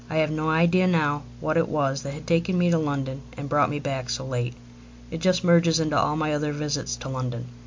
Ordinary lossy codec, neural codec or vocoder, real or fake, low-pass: MP3, 48 kbps; none; real; 7.2 kHz